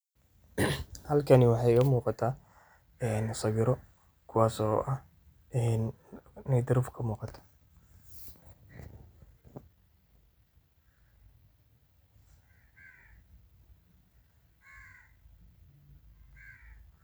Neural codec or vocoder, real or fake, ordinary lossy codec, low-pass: none; real; none; none